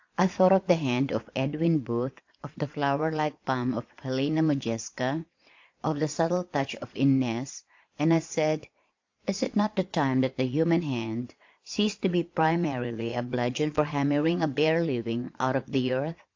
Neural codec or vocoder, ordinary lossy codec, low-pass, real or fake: vocoder, 22.05 kHz, 80 mel bands, Vocos; AAC, 48 kbps; 7.2 kHz; fake